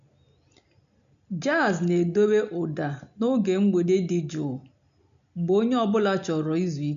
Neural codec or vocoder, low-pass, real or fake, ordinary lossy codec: none; 7.2 kHz; real; none